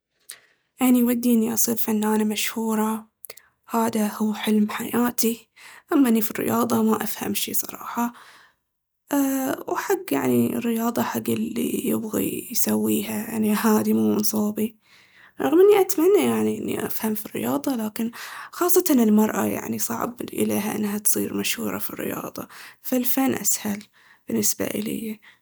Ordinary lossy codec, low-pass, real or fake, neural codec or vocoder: none; none; real; none